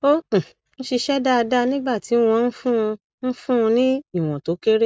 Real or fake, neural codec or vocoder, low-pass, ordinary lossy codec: real; none; none; none